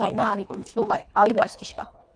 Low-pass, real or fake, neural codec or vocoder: 9.9 kHz; fake; codec, 24 kHz, 1.5 kbps, HILCodec